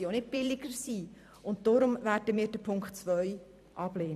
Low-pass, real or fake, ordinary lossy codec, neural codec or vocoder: 14.4 kHz; fake; MP3, 96 kbps; vocoder, 44.1 kHz, 128 mel bands every 256 samples, BigVGAN v2